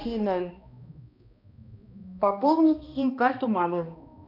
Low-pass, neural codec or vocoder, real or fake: 5.4 kHz; codec, 16 kHz, 1 kbps, X-Codec, HuBERT features, trained on balanced general audio; fake